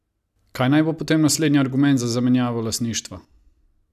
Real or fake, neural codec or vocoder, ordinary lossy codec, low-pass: real; none; none; 14.4 kHz